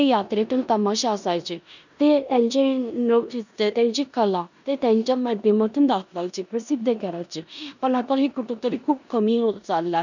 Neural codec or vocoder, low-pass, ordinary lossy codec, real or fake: codec, 16 kHz in and 24 kHz out, 0.9 kbps, LongCat-Audio-Codec, four codebook decoder; 7.2 kHz; none; fake